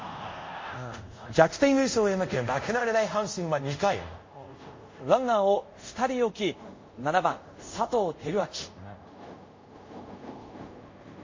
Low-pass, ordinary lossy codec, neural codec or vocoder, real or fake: 7.2 kHz; MP3, 32 kbps; codec, 24 kHz, 0.5 kbps, DualCodec; fake